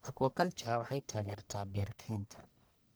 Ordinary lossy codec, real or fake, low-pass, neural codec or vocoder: none; fake; none; codec, 44.1 kHz, 1.7 kbps, Pupu-Codec